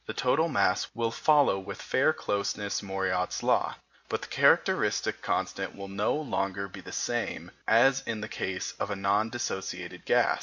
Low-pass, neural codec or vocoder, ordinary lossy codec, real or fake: 7.2 kHz; none; MP3, 48 kbps; real